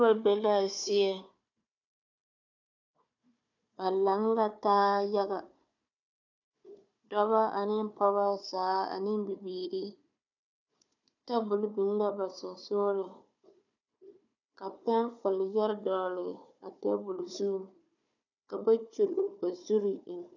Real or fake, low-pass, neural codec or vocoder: fake; 7.2 kHz; codec, 16 kHz, 4 kbps, FunCodec, trained on Chinese and English, 50 frames a second